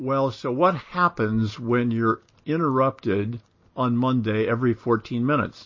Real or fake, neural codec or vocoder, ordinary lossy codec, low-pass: real; none; MP3, 32 kbps; 7.2 kHz